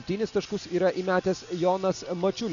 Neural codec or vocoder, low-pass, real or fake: none; 7.2 kHz; real